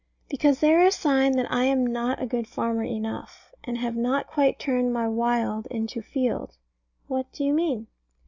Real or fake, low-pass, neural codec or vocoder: real; 7.2 kHz; none